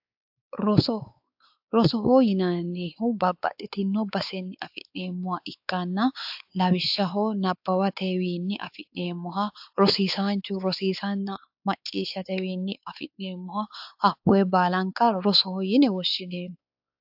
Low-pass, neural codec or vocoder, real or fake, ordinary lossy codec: 5.4 kHz; codec, 16 kHz, 4 kbps, X-Codec, WavLM features, trained on Multilingual LibriSpeech; fake; AAC, 48 kbps